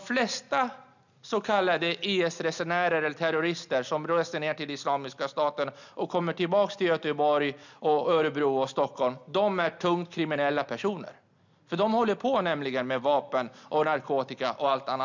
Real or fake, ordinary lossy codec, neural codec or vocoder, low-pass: real; none; none; 7.2 kHz